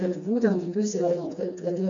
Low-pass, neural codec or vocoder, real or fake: 7.2 kHz; codec, 16 kHz, 2 kbps, FreqCodec, smaller model; fake